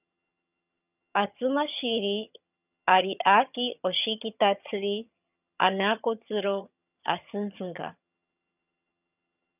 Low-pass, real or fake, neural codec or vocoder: 3.6 kHz; fake; vocoder, 22.05 kHz, 80 mel bands, HiFi-GAN